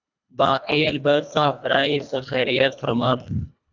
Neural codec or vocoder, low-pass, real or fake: codec, 24 kHz, 1.5 kbps, HILCodec; 7.2 kHz; fake